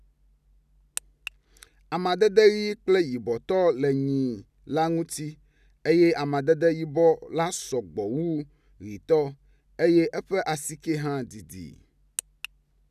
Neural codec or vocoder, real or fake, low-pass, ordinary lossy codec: none; real; 14.4 kHz; none